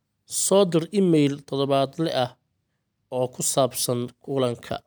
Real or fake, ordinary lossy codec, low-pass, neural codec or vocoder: real; none; none; none